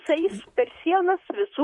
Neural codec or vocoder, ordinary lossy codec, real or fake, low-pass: vocoder, 44.1 kHz, 128 mel bands, Pupu-Vocoder; MP3, 48 kbps; fake; 10.8 kHz